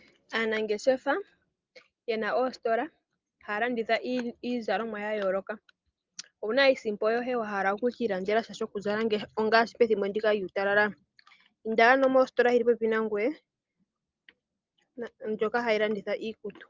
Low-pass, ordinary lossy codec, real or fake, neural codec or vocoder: 7.2 kHz; Opus, 32 kbps; real; none